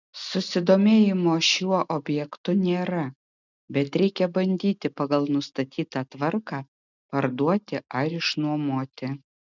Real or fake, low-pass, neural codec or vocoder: real; 7.2 kHz; none